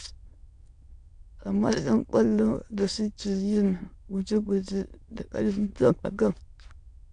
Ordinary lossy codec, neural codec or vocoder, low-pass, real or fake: AAC, 64 kbps; autoencoder, 22.05 kHz, a latent of 192 numbers a frame, VITS, trained on many speakers; 9.9 kHz; fake